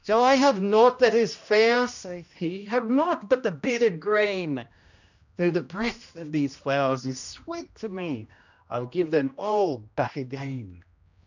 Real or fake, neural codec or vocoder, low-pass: fake; codec, 16 kHz, 1 kbps, X-Codec, HuBERT features, trained on general audio; 7.2 kHz